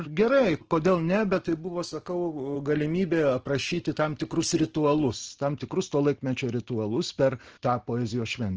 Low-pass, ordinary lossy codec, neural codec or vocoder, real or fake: 7.2 kHz; Opus, 16 kbps; none; real